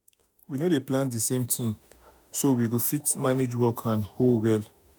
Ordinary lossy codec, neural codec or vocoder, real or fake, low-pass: none; autoencoder, 48 kHz, 32 numbers a frame, DAC-VAE, trained on Japanese speech; fake; none